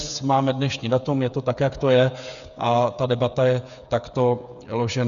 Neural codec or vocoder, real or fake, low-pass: codec, 16 kHz, 8 kbps, FreqCodec, smaller model; fake; 7.2 kHz